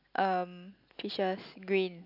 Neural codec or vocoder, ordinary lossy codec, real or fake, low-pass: none; AAC, 48 kbps; real; 5.4 kHz